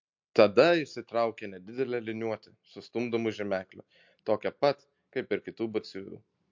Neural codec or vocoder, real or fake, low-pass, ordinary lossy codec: none; real; 7.2 kHz; MP3, 48 kbps